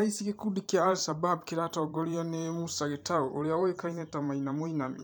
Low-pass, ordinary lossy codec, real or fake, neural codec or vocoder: none; none; fake; vocoder, 44.1 kHz, 128 mel bands every 512 samples, BigVGAN v2